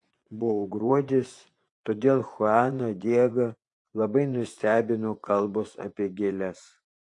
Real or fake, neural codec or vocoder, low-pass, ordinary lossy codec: fake; vocoder, 24 kHz, 100 mel bands, Vocos; 10.8 kHz; AAC, 48 kbps